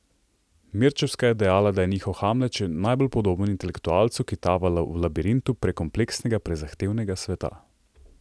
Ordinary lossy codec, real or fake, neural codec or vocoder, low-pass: none; real; none; none